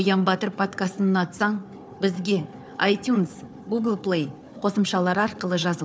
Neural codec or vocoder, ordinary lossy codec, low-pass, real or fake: codec, 16 kHz, 4 kbps, FunCodec, trained on Chinese and English, 50 frames a second; none; none; fake